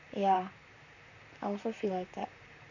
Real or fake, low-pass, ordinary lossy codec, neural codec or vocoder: fake; 7.2 kHz; none; vocoder, 44.1 kHz, 128 mel bands, Pupu-Vocoder